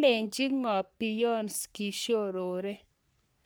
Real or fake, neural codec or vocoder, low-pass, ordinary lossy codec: fake; codec, 44.1 kHz, 3.4 kbps, Pupu-Codec; none; none